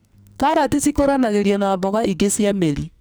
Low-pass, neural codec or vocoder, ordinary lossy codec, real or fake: none; codec, 44.1 kHz, 2.6 kbps, SNAC; none; fake